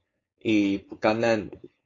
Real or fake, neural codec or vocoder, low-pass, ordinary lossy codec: fake; codec, 16 kHz, 4.8 kbps, FACodec; 7.2 kHz; AAC, 32 kbps